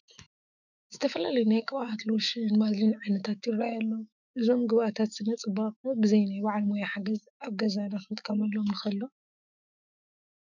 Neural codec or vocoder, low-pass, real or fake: autoencoder, 48 kHz, 128 numbers a frame, DAC-VAE, trained on Japanese speech; 7.2 kHz; fake